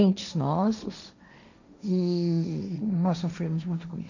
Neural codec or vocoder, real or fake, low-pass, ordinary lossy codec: codec, 16 kHz, 1.1 kbps, Voila-Tokenizer; fake; 7.2 kHz; none